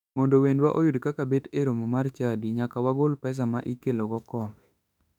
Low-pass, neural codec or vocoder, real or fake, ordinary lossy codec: 19.8 kHz; autoencoder, 48 kHz, 32 numbers a frame, DAC-VAE, trained on Japanese speech; fake; none